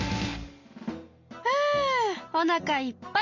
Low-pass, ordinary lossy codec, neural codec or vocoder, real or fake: 7.2 kHz; MP3, 64 kbps; none; real